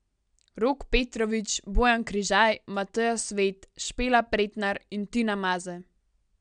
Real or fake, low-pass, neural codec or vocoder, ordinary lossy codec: real; 9.9 kHz; none; none